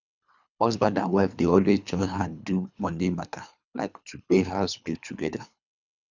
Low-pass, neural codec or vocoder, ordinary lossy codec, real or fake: 7.2 kHz; codec, 24 kHz, 3 kbps, HILCodec; none; fake